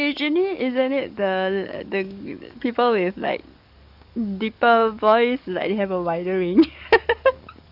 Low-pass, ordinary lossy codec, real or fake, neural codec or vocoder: 5.4 kHz; none; fake; codec, 44.1 kHz, 7.8 kbps, DAC